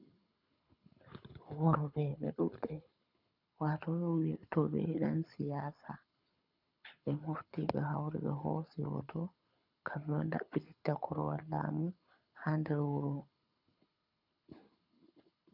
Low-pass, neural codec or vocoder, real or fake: 5.4 kHz; codec, 24 kHz, 6 kbps, HILCodec; fake